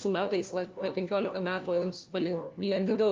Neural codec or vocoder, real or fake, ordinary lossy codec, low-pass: codec, 16 kHz, 1 kbps, FunCodec, trained on LibriTTS, 50 frames a second; fake; Opus, 24 kbps; 7.2 kHz